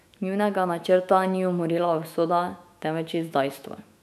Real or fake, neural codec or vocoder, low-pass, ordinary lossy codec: fake; autoencoder, 48 kHz, 128 numbers a frame, DAC-VAE, trained on Japanese speech; 14.4 kHz; none